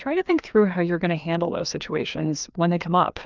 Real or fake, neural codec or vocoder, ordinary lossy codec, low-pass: fake; codec, 16 kHz, 2 kbps, FreqCodec, larger model; Opus, 24 kbps; 7.2 kHz